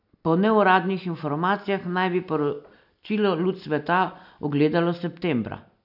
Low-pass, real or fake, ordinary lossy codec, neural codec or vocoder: 5.4 kHz; real; none; none